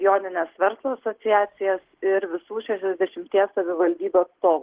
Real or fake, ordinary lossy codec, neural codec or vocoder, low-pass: real; Opus, 16 kbps; none; 3.6 kHz